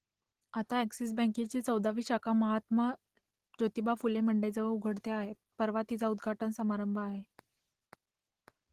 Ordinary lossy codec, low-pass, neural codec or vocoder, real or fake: Opus, 16 kbps; 14.4 kHz; none; real